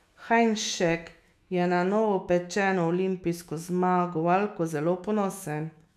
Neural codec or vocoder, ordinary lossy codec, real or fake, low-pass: autoencoder, 48 kHz, 128 numbers a frame, DAC-VAE, trained on Japanese speech; none; fake; 14.4 kHz